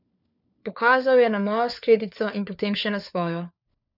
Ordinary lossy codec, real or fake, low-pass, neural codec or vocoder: none; fake; 5.4 kHz; codec, 16 kHz, 4 kbps, FunCodec, trained on LibriTTS, 50 frames a second